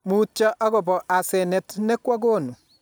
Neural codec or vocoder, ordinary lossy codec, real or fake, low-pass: none; none; real; none